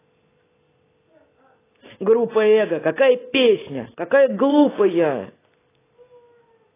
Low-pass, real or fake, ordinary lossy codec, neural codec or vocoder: 3.6 kHz; real; AAC, 16 kbps; none